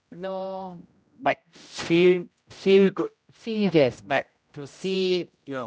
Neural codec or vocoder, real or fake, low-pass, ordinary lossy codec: codec, 16 kHz, 0.5 kbps, X-Codec, HuBERT features, trained on general audio; fake; none; none